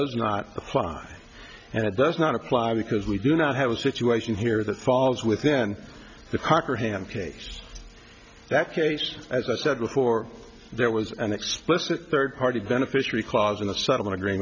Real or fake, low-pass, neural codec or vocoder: real; 7.2 kHz; none